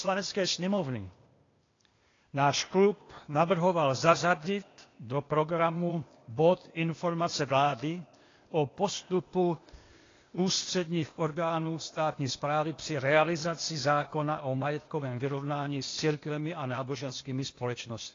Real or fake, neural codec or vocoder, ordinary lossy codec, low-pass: fake; codec, 16 kHz, 0.8 kbps, ZipCodec; AAC, 32 kbps; 7.2 kHz